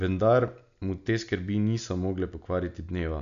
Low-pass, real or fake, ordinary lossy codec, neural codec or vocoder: 7.2 kHz; real; none; none